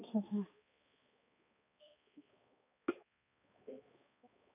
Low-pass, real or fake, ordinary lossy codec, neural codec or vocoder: 3.6 kHz; fake; none; codec, 16 kHz, 2 kbps, X-Codec, HuBERT features, trained on balanced general audio